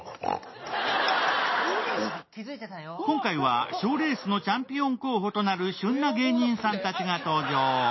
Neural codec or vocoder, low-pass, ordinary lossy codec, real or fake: none; 7.2 kHz; MP3, 24 kbps; real